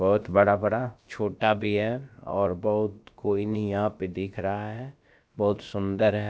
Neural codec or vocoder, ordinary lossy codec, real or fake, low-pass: codec, 16 kHz, about 1 kbps, DyCAST, with the encoder's durations; none; fake; none